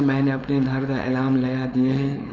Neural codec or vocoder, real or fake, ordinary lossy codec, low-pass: codec, 16 kHz, 4.8 kbps, FACodec; fake; none; none